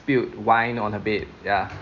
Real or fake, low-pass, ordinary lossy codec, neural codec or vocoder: real; 7.2 kHz; none; none